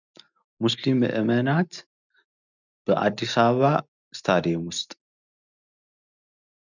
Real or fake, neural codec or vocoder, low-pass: real; none; 7.2 kHz